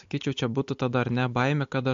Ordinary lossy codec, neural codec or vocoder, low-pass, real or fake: MP3, 48 kbps; none; 7.2 kHz; real